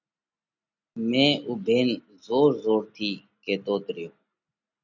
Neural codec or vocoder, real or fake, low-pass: none; real; 7.2 kHz